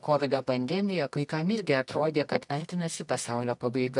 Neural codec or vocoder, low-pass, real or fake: codec, 24 kHz, 0.9 kbps, WavTokenizer, medium music audio release; 10.8 kHz; fake